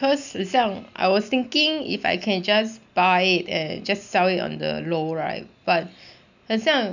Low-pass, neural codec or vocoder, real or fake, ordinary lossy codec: 7.2 kHz; none; real; none